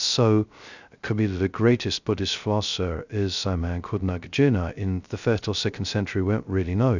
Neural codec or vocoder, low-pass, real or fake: codec, 16 kHz, 0.2 kbps, FocalCodec; 7.2 kHz; fake